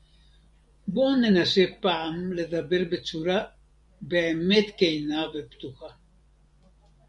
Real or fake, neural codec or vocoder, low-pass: fake; vocoder, 24 kHz, 100 mel bands, Vocos; 10.8 kHz